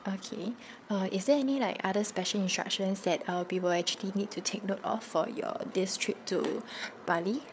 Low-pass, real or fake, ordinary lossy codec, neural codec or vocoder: none; fake; none; codec, 16 kHz, 8 kbps, FreqCodec, larger model